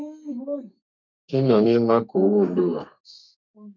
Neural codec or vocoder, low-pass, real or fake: codec, 32 kHz, 1.9 kbps, SNAC; 7.2 kHz; fake